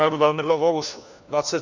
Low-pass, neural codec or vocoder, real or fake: 7.2 kHz; codec, 16 kHz, 1 kbps, FunCodec, trained on LibriTTS, 50 frames a second; fake